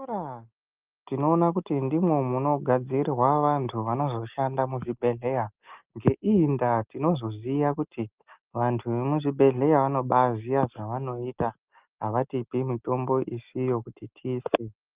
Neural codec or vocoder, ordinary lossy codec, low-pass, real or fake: none; Opus, 24 kbps; 3.6 kHz; real